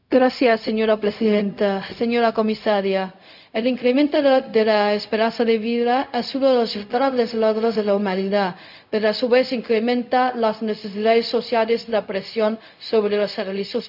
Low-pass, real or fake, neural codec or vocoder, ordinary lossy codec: 5.4 kHz; fake; codec, 16 kHz, 0.4 kbps, LongCat-Audio-Codec; none